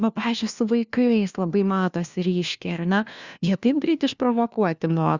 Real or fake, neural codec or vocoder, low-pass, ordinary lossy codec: fake; codec, 16 kHz, 1 kbps, FunCodec, trained on LibriTTS, 50 frames a second; 7.2 kHz; Opus, 64 kbps